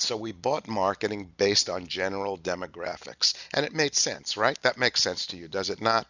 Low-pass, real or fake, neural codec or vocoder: 7.2 kHz; real; none